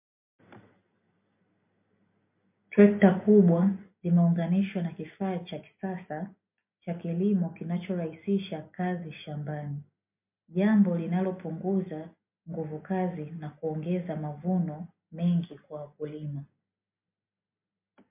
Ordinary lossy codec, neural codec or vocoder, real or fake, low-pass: MP3, 32 kbps; none; real; 3.6 kHz